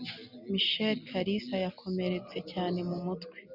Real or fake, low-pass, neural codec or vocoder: real; 5.4 kHz; none